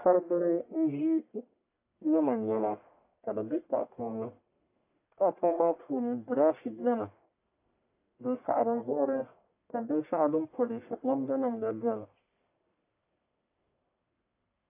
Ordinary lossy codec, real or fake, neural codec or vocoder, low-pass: AAC, 32 kbps; fake; codec, 44.1 kHz, 1.7 kbps, Pupu-Codec; 3.6 kHz